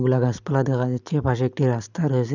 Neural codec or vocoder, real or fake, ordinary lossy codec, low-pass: none; real; none; 7.2 kHz